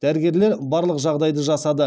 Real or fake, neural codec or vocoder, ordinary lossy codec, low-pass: real; none; none; none